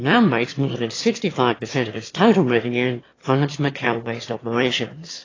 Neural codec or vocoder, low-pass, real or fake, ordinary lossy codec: autoencoder, 22.05 kHz, a latent of 192 numbers a frame, VITS, trained on one speaker; 7.2 kHz; fake; AAC, 32 kbps